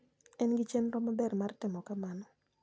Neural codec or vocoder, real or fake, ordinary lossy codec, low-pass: none; real; none; none